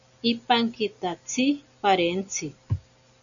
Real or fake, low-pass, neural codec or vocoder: real; 7.2 kHz; none